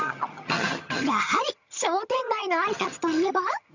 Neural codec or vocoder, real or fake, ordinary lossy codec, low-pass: vocoder, 22.05 kHz, 80 mel bands, HiFi-GAN; fake; none; 7.2 kHz